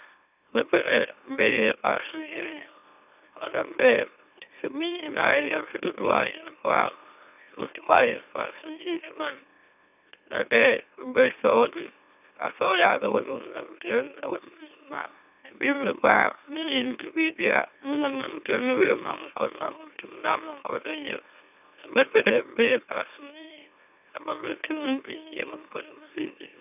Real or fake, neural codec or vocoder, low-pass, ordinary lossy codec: fake; autoencoder, 44.1 kHz, a latent of 192 numbers a frame, MeloTTS; 3.6 kHz; none